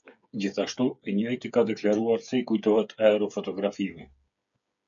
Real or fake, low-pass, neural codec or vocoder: fake; 7.2 kHz; codec, 16 kHz, 8 kbps, FreqCodec, smaller model